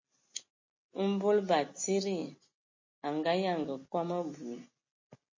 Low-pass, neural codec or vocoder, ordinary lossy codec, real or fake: 7.2 kHz; none; MP3, 32 kbps; real